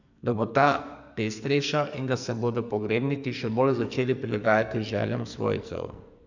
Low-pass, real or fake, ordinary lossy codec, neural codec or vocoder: 7.2 kHz; fake; none; codec, 44.1 kHz, 2.6 kbps, SNAC